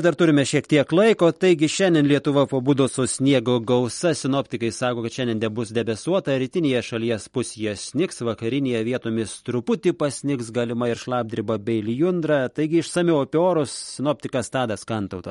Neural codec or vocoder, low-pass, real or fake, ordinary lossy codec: none; 19.8 kHz; real; MP3, 48 kbps